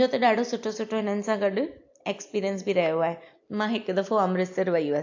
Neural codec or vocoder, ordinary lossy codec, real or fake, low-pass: none; AAC, 48 kbps; real; 7.2 kHz